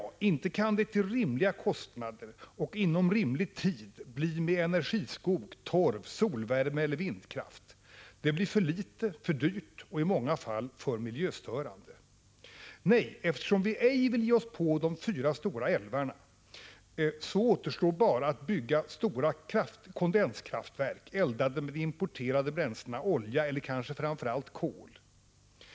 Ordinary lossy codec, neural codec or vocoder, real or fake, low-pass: none; none; real; none